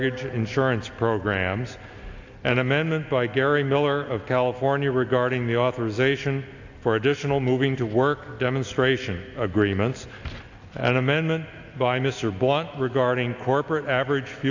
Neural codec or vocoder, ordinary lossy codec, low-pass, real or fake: none; AAC, 48 kbps; 7.2 kHz; real